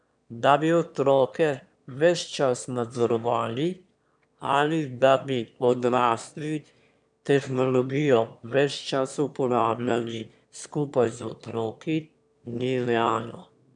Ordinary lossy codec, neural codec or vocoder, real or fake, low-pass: MP3, 96 kbps; autoencoder, 22.05 kHz, a latent of 192 numbers a frame, VITS, trained on one speaker; fake; 9.9 kHz